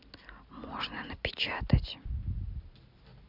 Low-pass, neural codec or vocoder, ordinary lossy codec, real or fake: 5.4 kHz; none; none; real